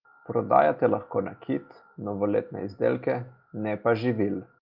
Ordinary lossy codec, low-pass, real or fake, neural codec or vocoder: Opus, 24 kbps; 5.4 kHz; real; none